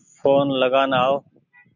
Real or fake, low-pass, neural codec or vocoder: real; 7.2 kHz; none